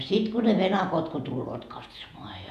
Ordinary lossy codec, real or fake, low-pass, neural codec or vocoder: none; fake; 14.4 kHz; vocoder, 48 kHz, 128 mel bands, Vocos